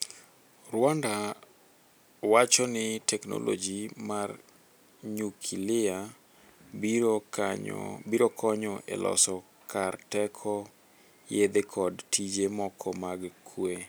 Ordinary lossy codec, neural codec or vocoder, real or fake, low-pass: none; none; real; none